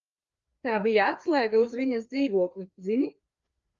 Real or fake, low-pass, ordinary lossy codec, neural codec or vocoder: fake; 7.2 kHz; Opus, 32 kbps; codec, 16 kHz, 2 kbps, FreqCodec, larger model